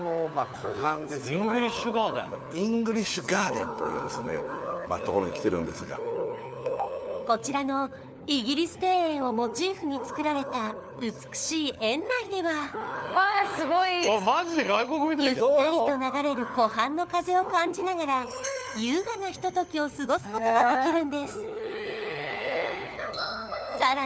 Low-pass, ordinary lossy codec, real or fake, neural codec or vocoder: none; none; fake; codec, 16 kHz, 4 kbps, FunCodec, trained on LibriTTS, 50 frames a second